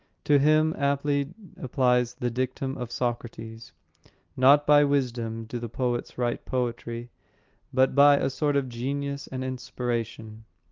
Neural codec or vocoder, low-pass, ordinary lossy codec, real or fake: none; 7.2 kHz; Opus, 32 kbps; real